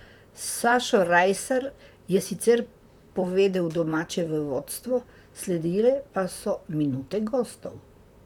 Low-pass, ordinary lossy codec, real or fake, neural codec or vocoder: 19.8 kHz; none; fake; vocoder, 44.1 kHz, 128 mel bands, Pupu-Vocoder